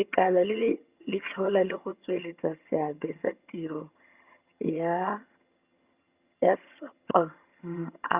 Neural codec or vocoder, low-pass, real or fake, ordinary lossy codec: vocoder, 22.05 kHz, 80 mel bands, HiFi-GAN; 3.6 kHz; fake; Opus, 64 kbps